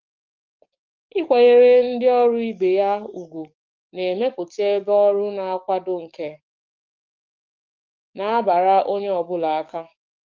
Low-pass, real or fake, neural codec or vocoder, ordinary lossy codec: 7.2 kHz; fake; codec, 44.1 kHz, 7.8 kbps, DAC; Opus, 24 kbps